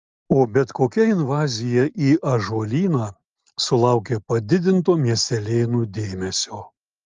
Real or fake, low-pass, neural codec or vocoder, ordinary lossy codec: real; 7.2 kHz; none; Opus, 32 kbps